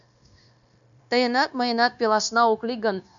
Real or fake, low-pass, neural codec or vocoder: fake; 7.2 kHz; codec, 16 kHz, 0.9 kbps, LongCat-Audio-Codec